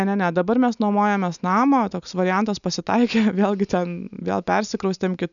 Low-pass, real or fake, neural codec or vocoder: 7.2 kHz; real; none